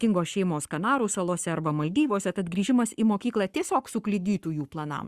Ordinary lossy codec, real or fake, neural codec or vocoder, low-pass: Opus, 64 kbps; fake; codec, 44.1 kHz, 7.8 kbps, Pupu-Codec; 14.4 kHz